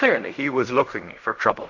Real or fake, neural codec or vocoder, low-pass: fake; codec, 16 kHz in and 24 kHz out, 0.4 kbps, LongCat-Audio-Codec, fine tuned four codebook decoder; 7.2 kHz